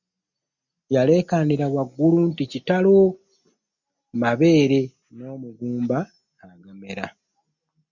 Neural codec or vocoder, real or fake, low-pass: none; real; 7.2 kHz